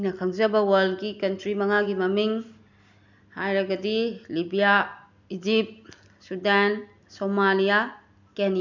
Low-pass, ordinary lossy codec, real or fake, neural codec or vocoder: 7.2 kHz; none; real; none